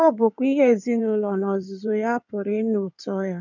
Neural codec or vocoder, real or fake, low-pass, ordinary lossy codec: codec, 16 kHz in and 24 kHz out, 2.2 kbps, FireRedTTS-2 codec; fake; 7.2 kHz; none